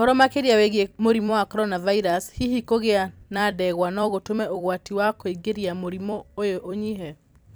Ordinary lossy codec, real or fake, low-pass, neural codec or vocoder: none; fake; none; vocoder, 44.1 kHz, 128 mel bands every 256 samples, BigVGAN v2